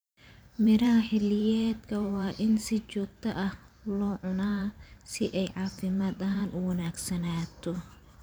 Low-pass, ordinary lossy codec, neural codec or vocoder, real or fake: none; none; vocoder, 44.1 kHz, 128 mel bands every 512 samples, BigVGAN v2; fake